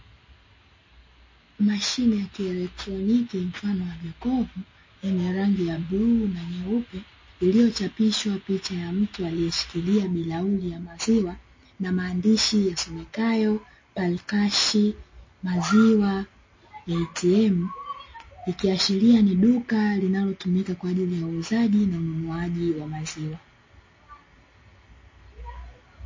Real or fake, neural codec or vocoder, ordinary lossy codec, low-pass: real; none; MP3, 32 kbps; 7.2 kHz